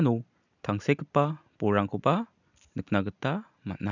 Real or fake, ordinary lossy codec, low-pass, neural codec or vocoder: real; none; 7.2 kHz; none